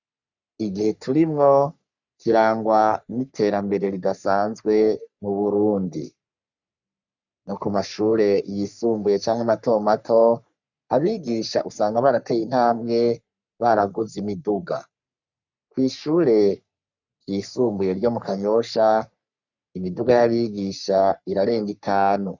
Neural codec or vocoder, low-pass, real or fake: codec, 44.1 kHz, 3.4 kbps, Pupu-Codec; 7.2 kHz; fake